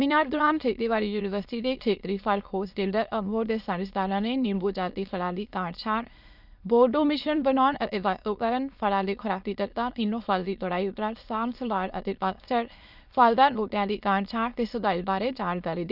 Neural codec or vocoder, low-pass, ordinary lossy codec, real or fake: autoencoder, 22.05 kHz, a latent of 192 numbers a frame, VITS, trained on many speakers; 5.4 kHz; none; fake